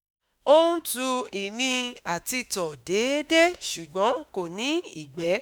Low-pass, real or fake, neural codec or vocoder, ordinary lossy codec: none; fake; autoencoder, 48 kHz, 32 numbers a frame, DAC-VAE, trained on Japanese speech; none